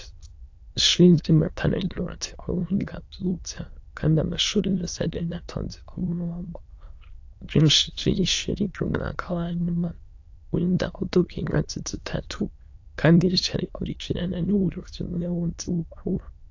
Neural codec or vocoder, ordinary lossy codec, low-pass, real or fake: autoencoder, 22.05 kHz, a latent of 192 numbers a frame, VITS, trained on many speakers; AAC, 48 kbps; 7.2 kHz; fake